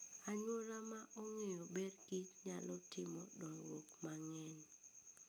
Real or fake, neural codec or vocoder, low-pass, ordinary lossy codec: real; none; none; none